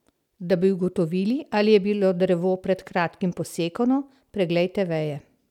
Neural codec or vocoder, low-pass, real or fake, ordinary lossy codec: none; 19.8 kHz; real; none